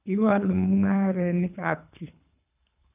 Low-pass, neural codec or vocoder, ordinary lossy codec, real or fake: 3.6 kHz; codec, 24 kHz, 3 kbps, HILCodec; none; fake